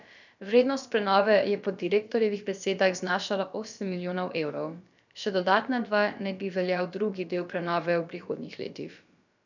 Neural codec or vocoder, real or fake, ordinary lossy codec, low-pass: codec, 16 kHz, about 1 kbps, DyCAST, with the encoder's durations; fake; none; 7.2 kHz